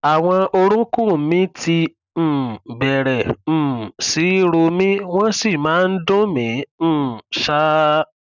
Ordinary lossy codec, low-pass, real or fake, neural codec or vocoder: none; 7.2 kHz; real; none